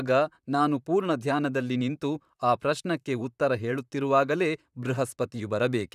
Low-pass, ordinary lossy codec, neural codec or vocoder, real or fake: 14.4 kHz; none; vocoder, 44.1 kHz, 128 mel bands, Pupu-Vocoder; fake